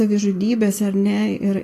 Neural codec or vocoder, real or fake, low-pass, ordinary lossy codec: none; real; 14.4 kHz; AAC, 48 kbps